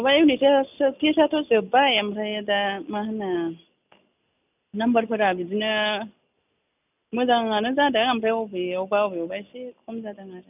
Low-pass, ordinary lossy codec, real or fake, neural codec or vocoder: 3.6 kHz; none; real; none